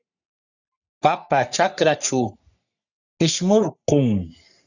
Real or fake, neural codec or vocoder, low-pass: fake; codec, 44.1 kHz, 3.4 kbps, Pupu-Codec; 7.2 kHz